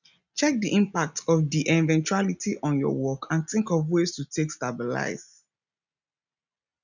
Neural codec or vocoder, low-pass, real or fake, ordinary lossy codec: none; 7.2 kHz; real; none